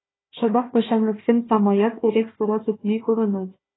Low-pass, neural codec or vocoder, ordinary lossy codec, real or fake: 7.2 kHz; codec, 16 kHz, 1 kbps, FunCodec, trained on Chinese and English, 50 frames a second; AAC, 16 kbps; fake